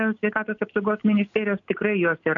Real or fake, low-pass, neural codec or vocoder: real; 7.2 kHz; none